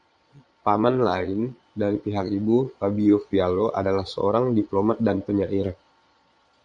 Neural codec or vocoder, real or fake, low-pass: vocoder, 22.05 kHz, 80 mel bands, Vocos; fake; 9.9 kHz